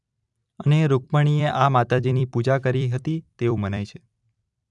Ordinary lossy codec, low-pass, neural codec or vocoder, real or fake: none; 10.8 kHz; vocoder, 44.1 kHz, 128 mel bands every 256 samples, BigVGAN v2; fake